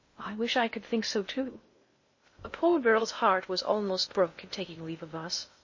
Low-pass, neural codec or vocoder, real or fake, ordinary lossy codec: 7.2 kHz; codec, 16 kHz in and 24 kHz out, 0.6 kbps, FocalCodec, streaming, 2048 codes; fake; MP3, 32 kbps